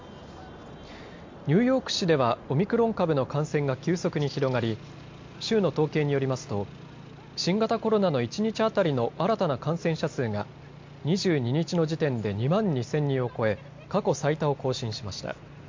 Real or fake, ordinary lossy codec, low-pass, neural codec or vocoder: real; MP3, 48 kbps; 7.2 kHz; none